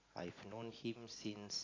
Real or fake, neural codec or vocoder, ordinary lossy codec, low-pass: real; none; AAC, 32 kbps; 7.2 kHz